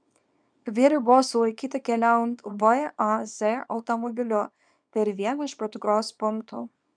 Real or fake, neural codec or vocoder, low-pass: fake; codec, 24 kHz, 0.9 kbps, WavTokenizer, small release; 9.9 kHz